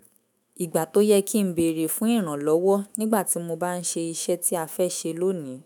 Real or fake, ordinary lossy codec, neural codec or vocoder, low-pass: fake; none; autoencoder, 48 kHz, 128 numbers a frame, DAC-VAE, trained on Japanese speech; none